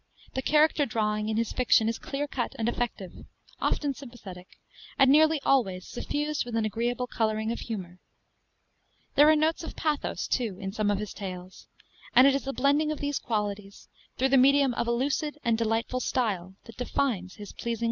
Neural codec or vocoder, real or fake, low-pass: none; real; 7.2 kHz